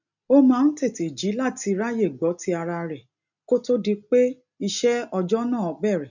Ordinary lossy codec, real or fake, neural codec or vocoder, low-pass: none; real; none; 7.2 kHz